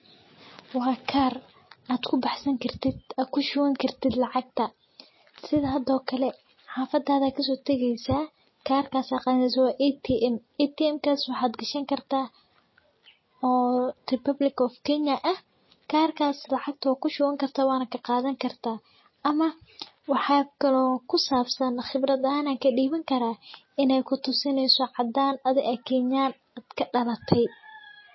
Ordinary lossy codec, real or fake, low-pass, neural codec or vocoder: MP3, 24 kbps; real; 7.2 kHz; none